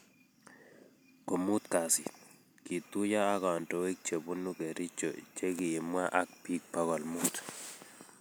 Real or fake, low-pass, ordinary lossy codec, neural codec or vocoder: real; none; none; none